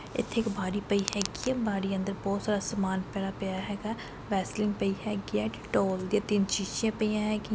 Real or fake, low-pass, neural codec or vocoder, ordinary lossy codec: real; none; none; none